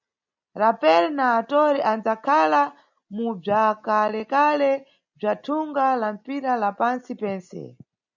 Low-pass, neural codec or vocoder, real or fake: 7.2 kHz; none; real